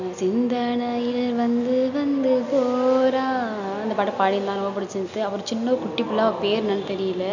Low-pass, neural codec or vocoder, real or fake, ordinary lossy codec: 7.2 kHz; none; real; none